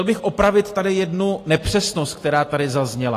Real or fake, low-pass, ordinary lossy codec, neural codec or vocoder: real; 14.4 kHz; AAC, 48 kbps; none